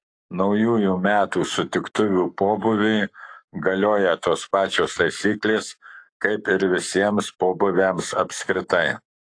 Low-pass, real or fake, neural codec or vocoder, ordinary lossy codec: 9.9 kHz; fake; codec, 44.1 kHz, 7.8 kbps, Pupu-Codec; AAC, 48 kbps